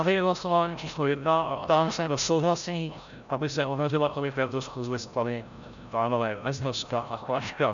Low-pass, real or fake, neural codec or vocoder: 7.2 kHz; fake; codec, 16 kHz, 0.5 kbps, FreqCodec, larger model